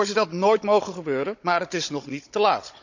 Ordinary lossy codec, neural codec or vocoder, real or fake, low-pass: none; codec, 16 kHz, 16 kbps, FunCodec, trained on Chinese and English, 50 frames a second; fake; 7.2 kHz